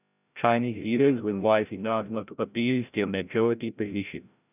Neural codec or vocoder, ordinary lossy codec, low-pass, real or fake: codec, 16 kHz, 0.5 kbps, FreqCodec, larger model; none; 3.6 kHz; fake